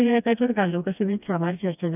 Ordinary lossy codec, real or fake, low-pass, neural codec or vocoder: none; fake; 3.6 kHz; codec, 16 kHz, 1 kbps, FreqCodec, smaller model